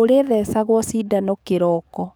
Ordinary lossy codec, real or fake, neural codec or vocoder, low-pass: none; fake; codec, 44.1 kHz, 7.8 kbps, DAC; none